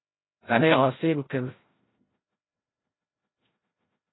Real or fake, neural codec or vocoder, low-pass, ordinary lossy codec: fake; codec, 16 kHz, 0.5 kbps, FreqCodec, larger model; 7.2 kHz; AAC, 16 kbps